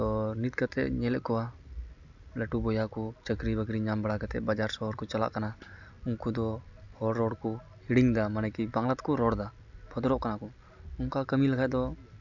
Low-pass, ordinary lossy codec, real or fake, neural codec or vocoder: 7.2 kHz; none; real; none